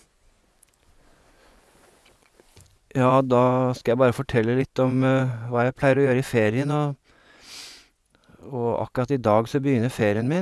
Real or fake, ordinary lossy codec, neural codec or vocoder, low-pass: fake; none; vocoder, 24 kHz, 100 mel bands, Vocos; none